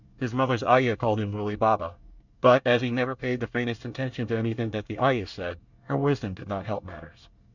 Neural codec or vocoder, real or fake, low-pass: codec, 24 kHz, 1 kbps, SNAC; fake; 7.2 kHz